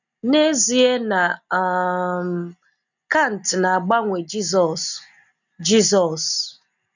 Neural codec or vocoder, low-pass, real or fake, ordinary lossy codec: none; 7.2 kHz; real; none